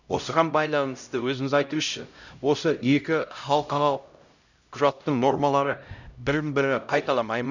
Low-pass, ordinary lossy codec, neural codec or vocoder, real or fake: 7.2 kHz; none; codec, 16 kHz, 0.5 kbps, X-Codec, HuBERT features, trained on LibriSpeech; fake